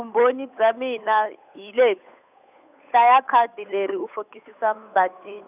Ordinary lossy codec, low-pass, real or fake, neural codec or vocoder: none; 3.6 kHz; fake; codec, 16 kHz, 8 kbps, FunCodec, trained on Chinese and English, 25 frames a second